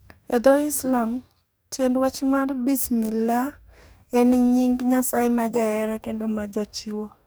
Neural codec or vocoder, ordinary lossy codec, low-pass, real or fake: codec, 44.1 kHz, 2.6 kbps, DAC; none; none; fake